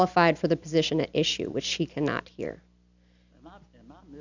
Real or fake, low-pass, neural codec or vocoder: real; 7.2 kHz; none